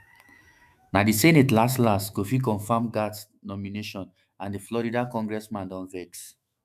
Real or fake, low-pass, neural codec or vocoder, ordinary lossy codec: fake; 14.4 kHz; autoencoder, 48 kHz, 128 numbers a frame, DAC-VAE, trained on Japanese speech; none